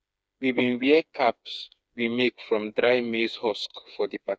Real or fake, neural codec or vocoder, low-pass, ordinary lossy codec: fake; codec, 16 kHz, 4 kbps, FreqCodec, smaller model; none; none